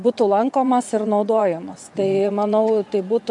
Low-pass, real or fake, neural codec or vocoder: 10.8 kHz; fake; vocoder, 24 kHz, 100 mel bands, Vocos